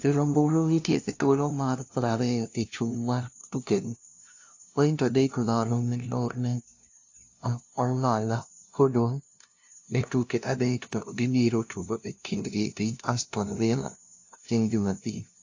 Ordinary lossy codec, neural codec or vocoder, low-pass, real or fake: AAC, 48 kbps; codec, 16 kHz, 0.5 kbps, FunCodec, trained on LibriTTS, 25 frames a second; 7.2 kHz; fake